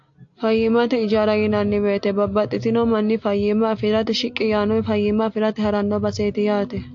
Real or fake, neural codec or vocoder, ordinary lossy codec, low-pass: real; none; AAC, 48 kbps; 7.2 kHz